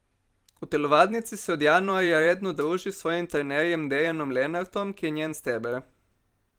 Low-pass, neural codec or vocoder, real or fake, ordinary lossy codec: 19.8 kHz; vocoder, 44.1 kHz, 128 mel bands every 512 samples, BigVGAN v2; fake; Opus, 24 kbps